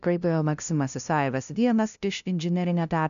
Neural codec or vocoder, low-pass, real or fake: codec, 16 kHz, 0.5 kbps, FunCodec, trained on LibriTTS, 25 frames a second; 7.2 kHz; fake